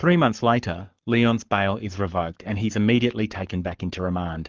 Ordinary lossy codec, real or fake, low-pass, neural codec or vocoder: Opus, 32 kbps; fake; 7.2 kHz; codec, 44.1 kHz, 7.8 kbps, Pupu-Codec